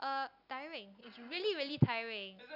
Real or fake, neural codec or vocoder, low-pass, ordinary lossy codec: real; none; 5.4 kHz; none